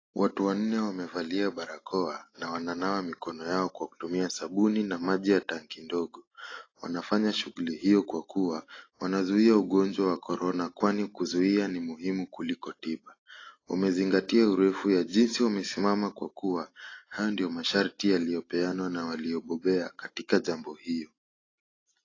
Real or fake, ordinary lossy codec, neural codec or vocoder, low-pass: real; AAC, 32 kbps; none; 7.2 kHz